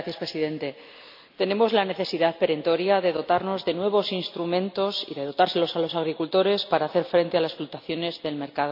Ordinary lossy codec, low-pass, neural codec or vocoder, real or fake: MP3, 48 kbps; 5.4 kHz; none; real